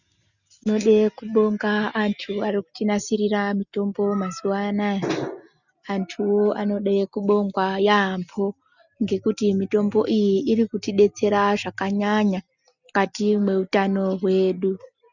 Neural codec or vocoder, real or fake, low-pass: none; real; 7.2 kHz